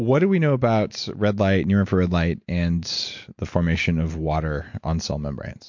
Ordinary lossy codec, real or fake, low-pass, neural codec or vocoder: MP3, 48 kbps; real; 7.2 kHz; none